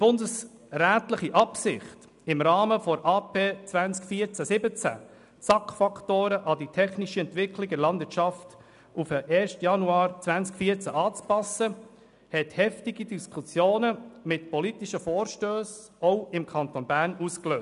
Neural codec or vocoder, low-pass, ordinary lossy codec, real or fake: none; 10.8 kHz; none; real